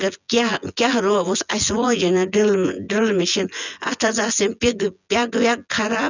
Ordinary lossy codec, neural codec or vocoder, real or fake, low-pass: none; vocoder, 24 kHz, 100 mel bands, Vocos; fake; 7.2 kHz